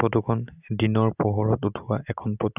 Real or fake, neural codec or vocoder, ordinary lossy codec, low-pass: real; none; none; 3.6 kHz